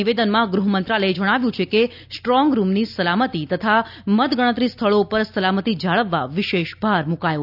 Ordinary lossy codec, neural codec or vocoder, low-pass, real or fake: none; none; 5.4 kHz; real